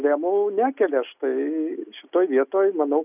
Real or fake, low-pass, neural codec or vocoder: real; 3.6 kHz; none